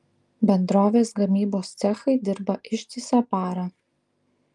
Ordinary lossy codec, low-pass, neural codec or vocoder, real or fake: Opus, 32 kbps; 10.8 kHz; none; real